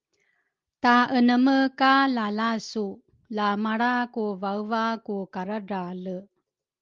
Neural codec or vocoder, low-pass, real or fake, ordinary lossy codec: none; 7.2 kHz; real; Opus, 32 kbps